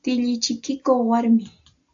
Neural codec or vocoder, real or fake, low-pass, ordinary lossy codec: none; real; 7.2 kHz; AAC, 64 kbps